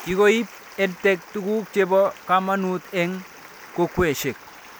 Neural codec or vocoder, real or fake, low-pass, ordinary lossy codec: none; real; none; none